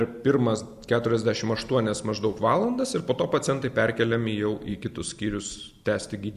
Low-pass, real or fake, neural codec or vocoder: 14.4 kHz; real; none